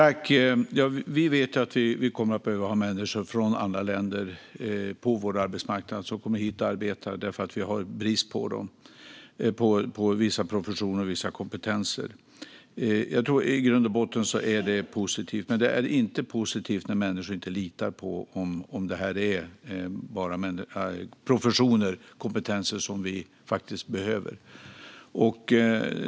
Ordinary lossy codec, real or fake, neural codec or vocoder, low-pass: none; real; none; none